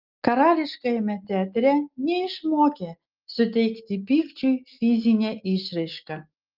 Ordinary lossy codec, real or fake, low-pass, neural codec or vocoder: Opus, 24 kbps; real; 5.4 kHz; none